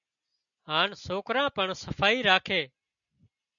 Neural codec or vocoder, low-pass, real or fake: none; 7.2 kHz; real